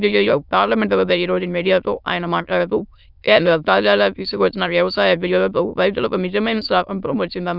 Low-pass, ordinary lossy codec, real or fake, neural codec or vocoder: 5.4 kHz; none; fake; autoencoder, 22.05 kHz, a latent of 192 numbers a frame, VITS, trained on many speakers